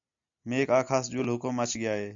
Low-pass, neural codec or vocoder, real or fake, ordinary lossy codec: 7.2 kHz; none; real; AAC, 64 kbps